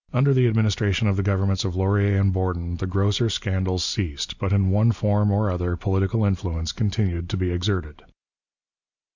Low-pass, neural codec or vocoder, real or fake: 7.2 kHz; none; real